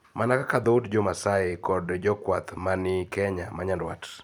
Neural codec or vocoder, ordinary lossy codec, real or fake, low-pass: vocoder, 44.1 kHz, 128 mel bands every 256 samples, BigVGAN v2; none; fake; 19.8 kHz